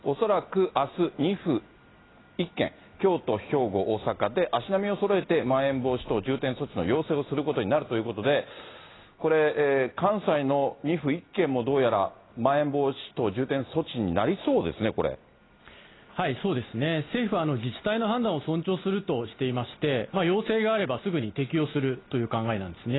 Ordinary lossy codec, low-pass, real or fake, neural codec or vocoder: AAC, 16 kbps; 7.2 kHz; real; none